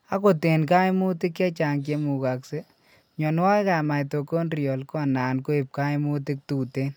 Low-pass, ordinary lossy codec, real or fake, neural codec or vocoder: none; none; real; none